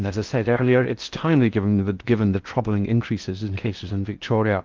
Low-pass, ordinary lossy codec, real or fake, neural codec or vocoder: 7.2 kHz; Opus, 32 kbps; fake; codec, 16 kHz in and 24 kHz out, 0.6 kbps, FocalCodec, streaming, 4096 codes